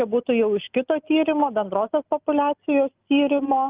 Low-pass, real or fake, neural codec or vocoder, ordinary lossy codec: 3.6 kHz; real; none; Opus, 32 kbps